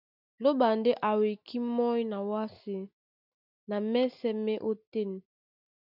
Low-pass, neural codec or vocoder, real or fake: 5.4 kHz; none; real